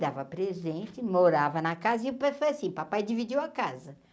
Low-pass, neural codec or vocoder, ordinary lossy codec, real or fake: none; none; none; real